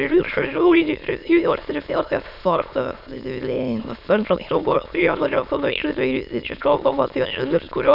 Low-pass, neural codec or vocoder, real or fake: 5.4 kHz; autoencoder, 22.05 kHz, a latent of 192 numbers a frame, VITS, trained on many speakers; fake